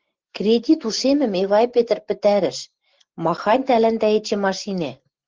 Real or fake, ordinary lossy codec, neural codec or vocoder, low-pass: real; Opus, 16 kbps; none; 7.2 kHz